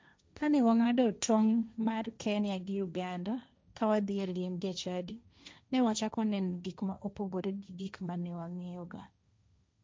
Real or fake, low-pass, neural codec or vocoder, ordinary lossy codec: fake; none; codec, 16 kHz, 1.1 kbps, Voila-Tokenizer; none